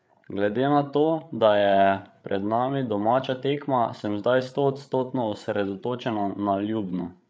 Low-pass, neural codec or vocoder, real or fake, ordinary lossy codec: none; codec, 16 kHz, 8 kbps, FreqCodec, larger model; fake; none